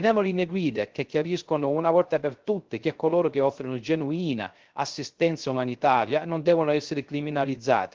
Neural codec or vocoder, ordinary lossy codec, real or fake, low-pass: codec, 16 kHz, 0.3 kbps, FocalCodec; Opus, 16 kbps; fake; 7.2 kHz